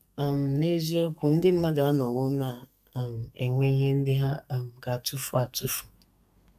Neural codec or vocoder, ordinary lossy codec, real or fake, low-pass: codec, 32 kHz, 1.9 kbps, SNAC; none; fake; 14.4 kHz